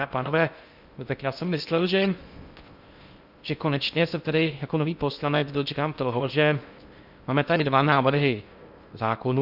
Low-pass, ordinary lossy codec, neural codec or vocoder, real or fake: 5.4 kHz; Opus, 64 kbps; codec, 16 kHz in and 24 kHz out, 0.6 kbps, FocalCodec, streaming, 2048 codes; fake